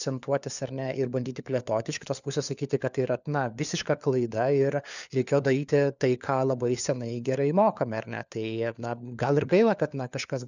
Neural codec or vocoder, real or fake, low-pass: codec, 16 kHz, 4 kbps, FunCodec, trained on LibriTTS, 50 frames a second; fake; 7.2 kHz